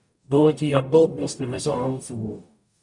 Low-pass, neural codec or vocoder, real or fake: 10.8 kHz; codec, 44.1 kHz, 0.9 kbps, DAC; fake